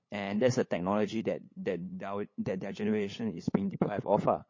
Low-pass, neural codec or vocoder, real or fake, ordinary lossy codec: 7.2 kHz; codec, 16 kHz, 8 kbps, FunCodec, trained on LibriTTS, 25 frames a second; fake; MP3, 32 kbps